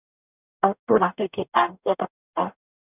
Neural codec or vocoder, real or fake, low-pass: codec, 44.1 kHz, 0.9 kbps, DAC; fake; 3.6 kHz